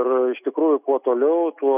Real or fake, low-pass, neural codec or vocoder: real; 3.6 kHz; none